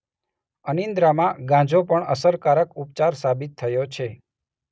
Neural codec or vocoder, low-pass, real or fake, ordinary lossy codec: none; none; real; none